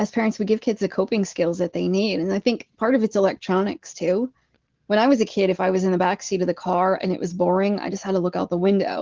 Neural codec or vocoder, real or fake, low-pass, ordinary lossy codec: none; real; 7.2 kHz; Opus, 16 kbps